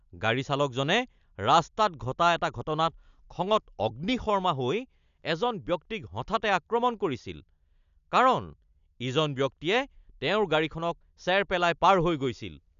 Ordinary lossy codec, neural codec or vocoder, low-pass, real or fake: none; none; 7.2 kHz; real